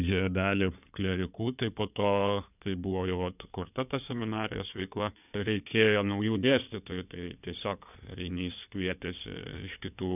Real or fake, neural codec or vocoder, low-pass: fake; codec, 16 kHz in and 24 kHz out, 2.2 kbps, FireRedTTS-2 codec; 3.6 kHz